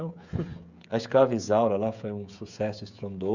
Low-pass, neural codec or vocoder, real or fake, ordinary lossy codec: 7.2 kHz; codec, 16 kHz, 16 kbps, FreqCodec, smaller model; fake; none